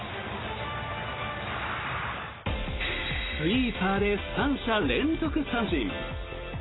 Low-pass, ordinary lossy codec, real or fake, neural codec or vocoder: 7.2 kHz; AAC, 16 kbps; real; none